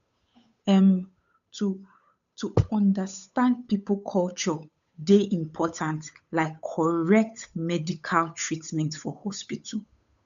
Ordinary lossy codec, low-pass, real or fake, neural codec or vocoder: none; 7.2 kHz; fake; codec, 16 kHz, 8 kbps, FunCodec, trained on Chinese and English, 25 frames a second